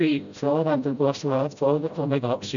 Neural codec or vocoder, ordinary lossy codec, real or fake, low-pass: codec, 16 kHz, 0.5 kbps, FreqCodec, smaller model; none; fake; 7.2 kHz